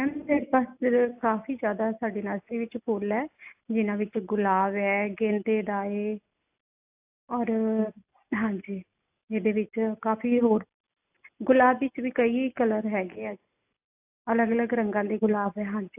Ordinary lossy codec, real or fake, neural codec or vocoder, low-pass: AAC, 32 kbps; real; none; 3.6 kHz